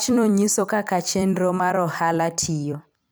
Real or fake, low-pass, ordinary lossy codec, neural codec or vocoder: fake; none; none; vocoder, 44.1 kHz, 128 mel bands every 256 samples, BigVGAN v2